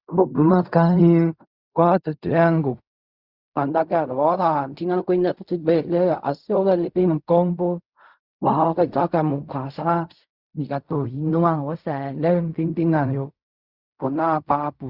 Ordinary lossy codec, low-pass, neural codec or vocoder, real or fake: Opus, 64 kbps; 5.4 kHz; codec, 16 kHz in and 24 kHz out, 0.4 kbps, LongCat-Audio-Codec, fine tuned four codebook decoder; fake